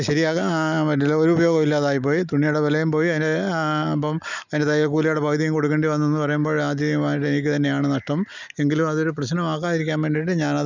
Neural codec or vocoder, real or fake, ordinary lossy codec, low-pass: none; real; none; 7.2 kHz